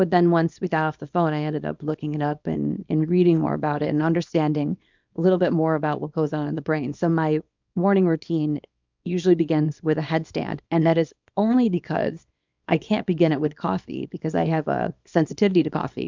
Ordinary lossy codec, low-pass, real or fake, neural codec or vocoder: MP3, 64 kbps; 7.2 kHz; fake; codec, 24 kHz, 0.9 kbps, WavTokenizer, small release